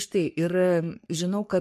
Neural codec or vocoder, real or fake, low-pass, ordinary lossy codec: codec, 44.1 kHz, 3.4 kbps, Pupu-Codec; fake; 14.4 kHz; MP3, 64 kbps